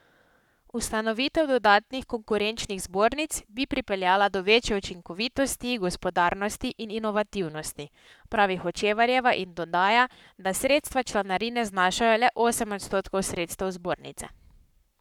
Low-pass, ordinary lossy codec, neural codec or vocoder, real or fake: 19.8 kHz; none; codec, 44.1 kHz, 7.8 kbps, Pupu-Codec; fake